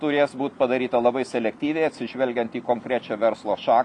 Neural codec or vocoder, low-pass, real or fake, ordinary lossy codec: none; 10.8 kHz; real; AAC, 64 kbps